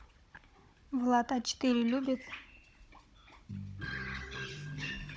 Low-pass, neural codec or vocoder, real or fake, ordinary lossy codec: none; codec, 16 kHz, 16 kbps, FunCodec, trained on Chinese and English, 50 frames a second; fake; none